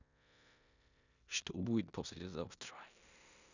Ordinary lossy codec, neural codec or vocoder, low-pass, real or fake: none; codec, 16 kHz in and 24 kHz out, 0.9 kbps, LongCat-Audio-Codec, four codebook decoder; 7.2 kHz; fake